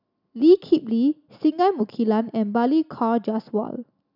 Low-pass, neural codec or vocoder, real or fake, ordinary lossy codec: 5.4 kHz; none; real; none